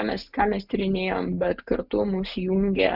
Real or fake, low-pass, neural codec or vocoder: real; 5.4 kHz; none